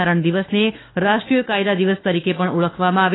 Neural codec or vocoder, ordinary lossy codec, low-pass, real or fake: autoencoder, 48 kHz, 32 numbers a frame, DAC-VAE, trained on Japanese speech; AAC, 16 kbps; 7.2 kHz; fake